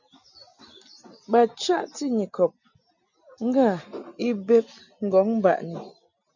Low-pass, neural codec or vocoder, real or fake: 7.2 kHz; none; real